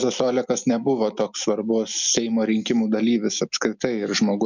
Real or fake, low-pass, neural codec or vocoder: real; 7.2 kHz; none